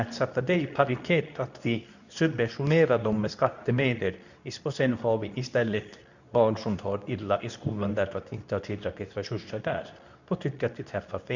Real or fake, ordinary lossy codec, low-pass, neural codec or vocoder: fake; none; 7.2 kHz; codec, 24 kHz, 0.9 kbps, WavTokenizer, medium speech release version 2